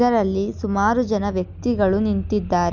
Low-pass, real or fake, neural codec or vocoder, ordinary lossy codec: 7.2 kHz; real; none; none